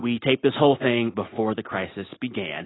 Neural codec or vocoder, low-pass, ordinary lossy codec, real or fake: none; 7.2 kHz; AAC, 16 kbps; real